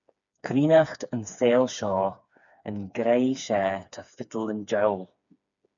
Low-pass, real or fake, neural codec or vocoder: 7.2 kHz; fake; codec, 16 kHz, 4 kbps, FreqCodec, smaller model